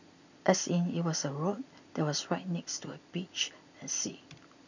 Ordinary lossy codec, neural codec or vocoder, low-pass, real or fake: none; none; 7.2 kHz; real